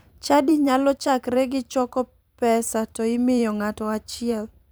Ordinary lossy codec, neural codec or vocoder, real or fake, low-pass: none; vocoder, 44.1 kHz, 128 mel bands every 512 samples, BigVGAN v2; fake; none